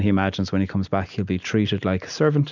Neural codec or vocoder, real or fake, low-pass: none; real; 7.2 kHz